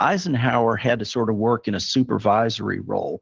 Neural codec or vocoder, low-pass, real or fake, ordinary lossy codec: none; 7.2 kHz; real; Opus, 16 kbps